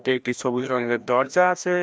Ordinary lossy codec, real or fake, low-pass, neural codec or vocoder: none; fake; none; codec, 16 kHz, 1 kbps, FreqCodec, larger model